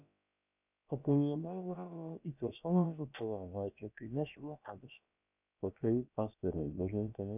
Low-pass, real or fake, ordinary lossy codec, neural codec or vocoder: 3.6 kHz; fake; none; codec, 16 kHz, about 1 kbps, DyCAST, with the encoder's durations